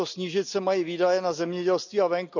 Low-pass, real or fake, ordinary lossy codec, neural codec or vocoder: 7.2 kHz; real; none; none